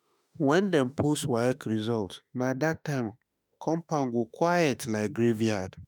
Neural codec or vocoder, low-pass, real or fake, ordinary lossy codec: autoencoder, 48 kHz, 32 numbers a frame, DAC-VAE, trained on Japanese speech; none; fake; none